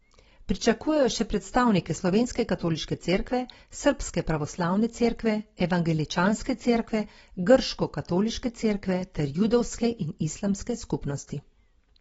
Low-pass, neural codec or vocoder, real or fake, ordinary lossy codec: 19.8 kHz; none; real; AAC, 24 kbps